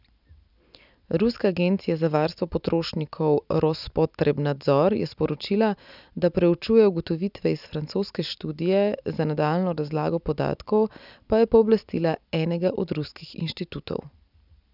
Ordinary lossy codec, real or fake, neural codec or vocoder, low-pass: none; real; none; 5.4 kHz